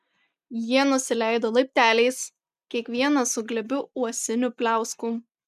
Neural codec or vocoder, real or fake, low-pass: none; real; 14.4 kHz